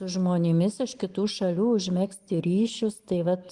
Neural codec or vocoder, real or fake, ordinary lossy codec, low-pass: none; real; Opus, 24 kbps; 10.8 kHz